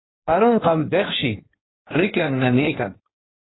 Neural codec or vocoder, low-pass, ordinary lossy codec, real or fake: codec, 16 kHz in and 24 kHz out, 1.1 kbps, FireRedTTS-2 codec; 7.2 kHz; AAC, 16 kbps; fake